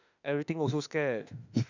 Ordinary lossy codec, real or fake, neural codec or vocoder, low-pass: none; fake; autoencoder, 48 kHz, 32 numbers a frame, DAC-VAE, trained on Japanese speech; 7.2 kHz